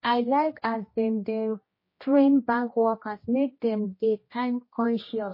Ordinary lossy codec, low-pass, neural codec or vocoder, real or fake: MP3, 24 kbps; 5.4 kHz; codec, 16 kHz, 1 kbps, X-Codec, HuBERT features, trained on general audio; fake